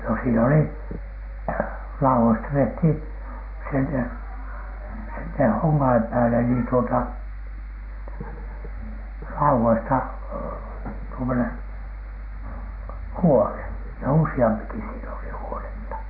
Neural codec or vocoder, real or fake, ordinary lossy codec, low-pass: none; real; AAC, 48 kbps; 5.4 kHz